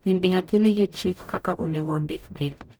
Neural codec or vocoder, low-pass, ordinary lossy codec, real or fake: codec, 44.1 kHz, 0.9 kbps, DAC; none; none; fake